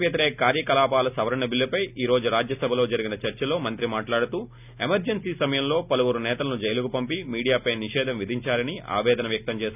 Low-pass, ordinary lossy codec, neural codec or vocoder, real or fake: 3.6 kHz; none; none; real